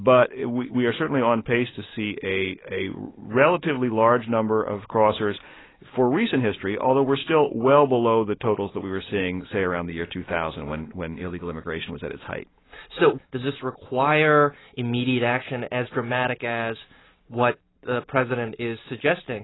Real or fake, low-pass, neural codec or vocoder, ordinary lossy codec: real; 7.2 kHz; none; AAC, 16 kbps